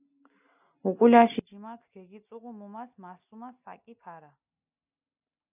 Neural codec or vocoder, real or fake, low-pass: none; real; 3.6 kHz